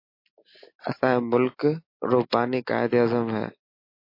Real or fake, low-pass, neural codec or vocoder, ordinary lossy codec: real; 5.4 kHz; none; MP3, 32 kbps